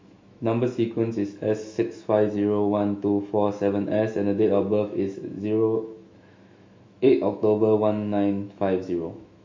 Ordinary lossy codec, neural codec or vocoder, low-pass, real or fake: MP3, 48 kbps; autoencoder, 48 kHz, 128 numbers a frame, DAC-VAE, trained on Japanese speech; 7.2 kHz; fake